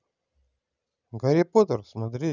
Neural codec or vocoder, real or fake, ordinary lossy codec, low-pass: none; real; none; 7.2 kHz